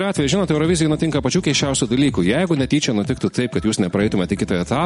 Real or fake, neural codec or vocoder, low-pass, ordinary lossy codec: real; none; 19.8 kHz; MP3, 48 kbps